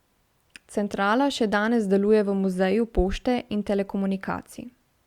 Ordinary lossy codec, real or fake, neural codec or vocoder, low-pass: Opus, 64 kbps; real; none; 19.8 kHz